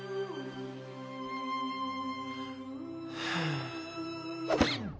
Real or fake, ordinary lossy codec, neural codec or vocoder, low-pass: real; none; none; none